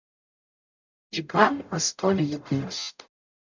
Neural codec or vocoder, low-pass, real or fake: codec, 44.1 kHz, 0.9 kbps, DAC; 7.2 kHz; fake